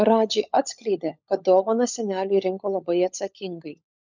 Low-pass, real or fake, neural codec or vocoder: 7.2 kHz; fake; codec, 16 kHz, 16 kbps, FunCodec, trained on LibriTTS, 50 frames a second